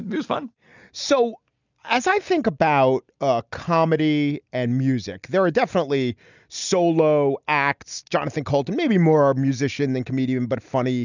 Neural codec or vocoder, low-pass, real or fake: none; 7.2 kHz; real